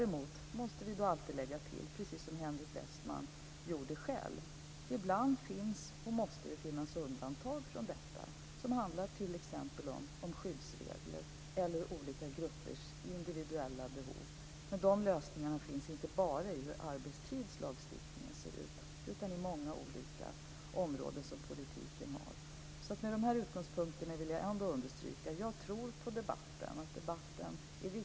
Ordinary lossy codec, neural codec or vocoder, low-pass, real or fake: none; none; none; real